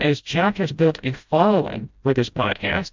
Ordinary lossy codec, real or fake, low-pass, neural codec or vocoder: MP3, 64 kbps; fake; 7.2 kHz; codec, 16 kHz, 0.5 kbps, FreqCodec, smaller model